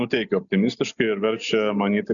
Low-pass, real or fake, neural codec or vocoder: 7.2 kHz; real; none